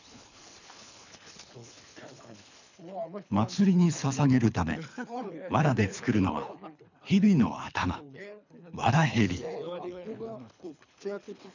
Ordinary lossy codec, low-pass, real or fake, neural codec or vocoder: none; 7.2 kHz; fake; codec, 24 kHz, 3 kbps, HILCodec